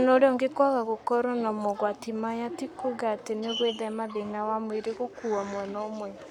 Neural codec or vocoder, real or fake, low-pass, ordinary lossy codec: codec, 44.1 kHz, 7.8 kbps, Pupu-Codec; fake; 19.8 kHz; none